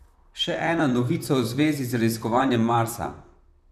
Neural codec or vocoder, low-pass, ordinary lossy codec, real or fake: vocoder, 44.1 kHz, 128 mel bands, Pupu-Vocoder; 14.4 kHz; none; fake